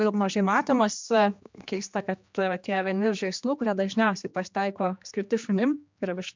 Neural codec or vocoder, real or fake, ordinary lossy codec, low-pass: codec, 16 kHz, 2 kbps, X-Codec, HuBERT features, trained on general audio; fake; MP3, 64 kbps; 7.2 kHz